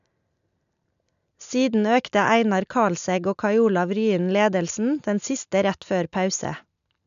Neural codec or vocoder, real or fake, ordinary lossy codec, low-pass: none; real; none; 7.2 kHz